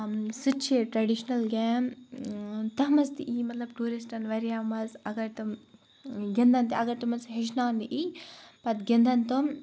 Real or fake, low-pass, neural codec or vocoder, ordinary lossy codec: real; none; none; none